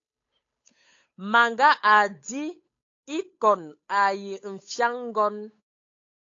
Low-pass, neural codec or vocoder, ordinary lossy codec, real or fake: 7.2 kHz; codec, 16 kHz, 8 kbps, FunCodec, trained on Chinese and English, 25 frames a second; AAC, 48 kbps; fake